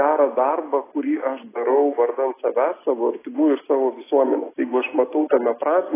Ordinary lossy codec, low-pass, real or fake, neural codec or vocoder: AAC, 16 kbps; 3.6 kHz; real; none